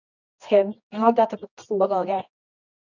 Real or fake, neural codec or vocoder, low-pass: fake; codec, 24 kHz, 0.9 kbps, WavTokenizer, medium music audio release; 7.2 kHz